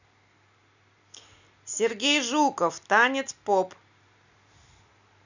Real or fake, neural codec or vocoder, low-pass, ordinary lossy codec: real; none; 7.2 kHz; none